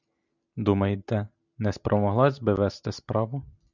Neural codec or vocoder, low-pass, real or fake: none; 7.2 kHz; real